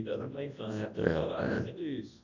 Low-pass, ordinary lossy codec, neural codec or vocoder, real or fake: 7.2 kHz; AAC, 48 kbps; codec, 24 kHz, 0.9 kbps, WavTokenizer, large speech release; fake